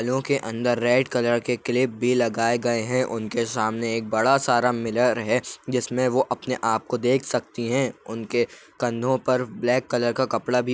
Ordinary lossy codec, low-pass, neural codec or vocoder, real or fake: none; none; none; real